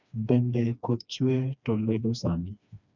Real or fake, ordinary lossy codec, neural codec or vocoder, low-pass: fake; none; codec, 16 kHz, 2 kbps, FreqCodec, smaller model; 7.2 kHz